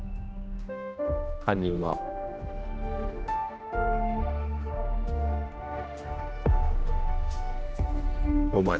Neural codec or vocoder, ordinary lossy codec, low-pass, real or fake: codec, 16 kHz, 1 kbps, X-Codec, HuBERT features, trained on general audio; none; none; fake